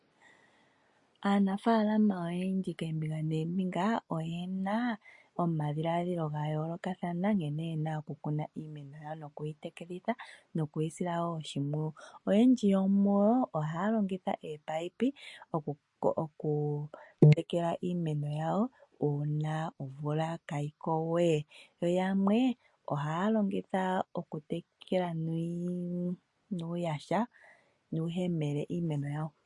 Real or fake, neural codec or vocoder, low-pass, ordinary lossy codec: real; none; 10.8 kHz; MP3, 48 kbps